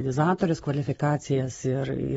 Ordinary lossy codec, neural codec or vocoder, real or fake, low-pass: AAC, 24 kbps; none; real; 19.8 kHz